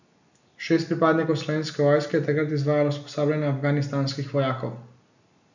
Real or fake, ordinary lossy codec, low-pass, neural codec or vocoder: real; none; 7.2 kHz; none